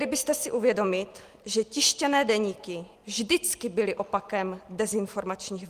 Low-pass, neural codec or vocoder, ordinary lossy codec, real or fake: 14.4 kHz; none; Opus, 24 kbps; real